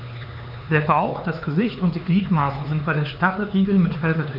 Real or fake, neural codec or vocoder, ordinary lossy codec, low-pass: fake; codec, 16 kHz, 4 kbps, X-Codec, HuBERT features, trained on LibriSpeech; none; 5.4 kHz